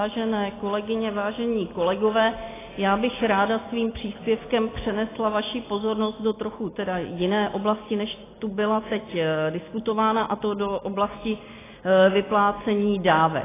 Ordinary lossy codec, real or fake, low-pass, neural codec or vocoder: AAC, 16 kbps; real; 3.6 kHz; none